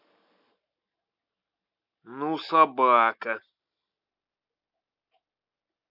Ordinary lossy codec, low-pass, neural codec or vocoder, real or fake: none; 5.4 kHz; none; real